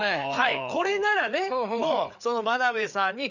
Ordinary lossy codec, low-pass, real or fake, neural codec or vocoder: none; 7.2 kHz; fake; codec, 16 kHz, 4 kbps, FreqCodec, larger model